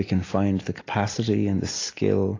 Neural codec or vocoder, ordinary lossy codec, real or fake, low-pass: none; AAC, 32 kbps; real; 7.2 kHz